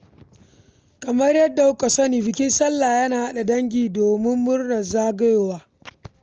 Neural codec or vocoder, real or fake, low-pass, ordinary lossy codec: none; real; 7.2 kHz; Opus, 24 kbps